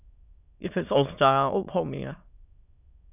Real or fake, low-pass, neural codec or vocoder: fake; 3.6 kHz; autoencoder, 22.05 kHz, a latent of 192 numbers a frame, VITS, trained on many speakers